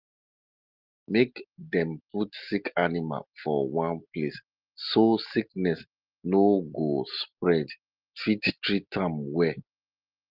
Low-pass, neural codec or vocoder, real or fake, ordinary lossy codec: 5.4 kHz; none; real; Opus, 24 kbps